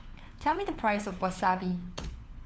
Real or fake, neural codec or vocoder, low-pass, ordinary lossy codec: fake; codec, 16 kHz, 4 kbps, FunCodec, trained on LibriTTS, 50 frames a second; none; none